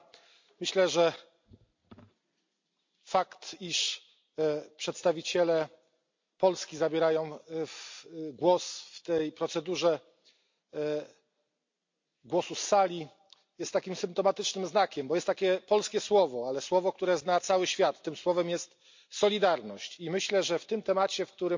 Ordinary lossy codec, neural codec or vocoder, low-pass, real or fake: MP3, 48 kbps; none; 7.2 kHz; real